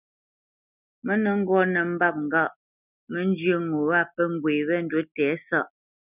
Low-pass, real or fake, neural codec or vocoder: 3.6 kHz; real; none